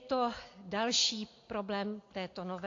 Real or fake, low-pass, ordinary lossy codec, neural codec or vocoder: real; 7.2 kHz; AAC, 48 kbps; none